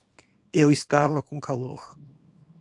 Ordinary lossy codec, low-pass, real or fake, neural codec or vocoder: AAC, 64 kbps; 10.8 kHz; fake; codec, 24 kHz, 0.9 kbps, WavTokenizer, small release